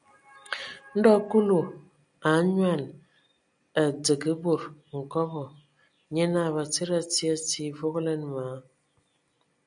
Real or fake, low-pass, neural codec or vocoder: real; 9.9 kHz; none